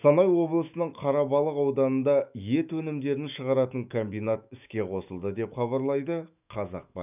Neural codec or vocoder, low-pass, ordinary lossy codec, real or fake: autoencoder, 48 kHz, 128 numbers a frame, DAC-VAE, trained on Japanese speech; 3.6 kHz; none; fake